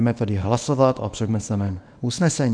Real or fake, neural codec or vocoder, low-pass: fake; codec, 24 kHz, 0.9 kbps, WavTokenizer, small release; 9.9 kHz